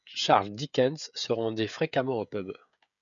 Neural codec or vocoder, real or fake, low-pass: codec, 16 kHz, 16 kbps, FreqCodec, smaller model; fake; 7.2 kHz